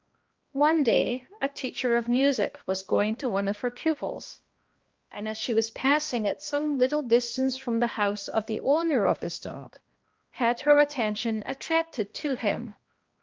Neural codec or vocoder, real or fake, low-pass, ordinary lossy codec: codec, 16 kHz, 1 kbps, X-Codec, HuBERT features, trained on balanced general audio; fake; 7.2 kHz; Opus, 32 kbps